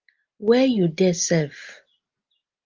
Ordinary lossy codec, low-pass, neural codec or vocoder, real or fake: Opus, 32 kbps; 7.2 kHz; none; real